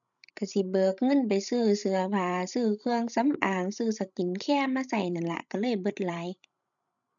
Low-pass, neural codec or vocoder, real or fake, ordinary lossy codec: 7.2 kHz; codec, 16 kHz, 16 kbps, FreqCodec, larger model; fake; none